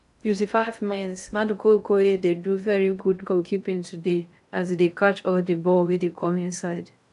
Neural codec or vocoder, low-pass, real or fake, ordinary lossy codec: codec, 16 kHz in and 24 kHz out, 0.6 kbps, FocalCodec, streaming, 2048 codes; 10.8 kHz; fake; none